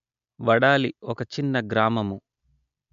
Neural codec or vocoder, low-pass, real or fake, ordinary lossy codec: none; 7.2 kHz; real; MP3, 64 kbps